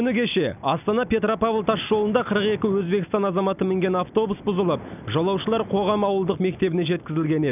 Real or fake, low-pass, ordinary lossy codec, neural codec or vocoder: real; 3.6 kHz; none; none